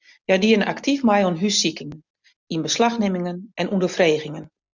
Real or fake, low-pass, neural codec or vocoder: real; 7.2 kHz; none